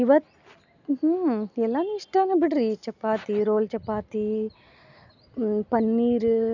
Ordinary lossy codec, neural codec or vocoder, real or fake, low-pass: none; none; real; 7.2 kHz